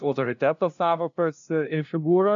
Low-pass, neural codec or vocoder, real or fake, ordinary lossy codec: 7.2 kHz; codec, 16 kHz, 0.5 kbps, FunCodec, trained on LibriTTS, 25 frames a second; fake; AAC, 64 kbps